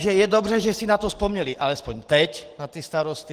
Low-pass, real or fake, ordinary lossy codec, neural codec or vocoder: 14.4 kHz; real; Opus, 16 kbps; none